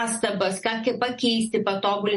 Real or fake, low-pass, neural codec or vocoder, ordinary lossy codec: real; 14.4 kHz; none; MP3, 48 kbps